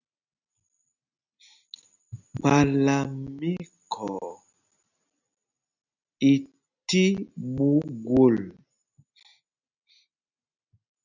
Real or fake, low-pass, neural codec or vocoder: real; 7.2 kHz; none